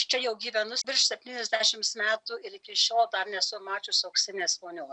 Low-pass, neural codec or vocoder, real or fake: 9.9 kHz; none; real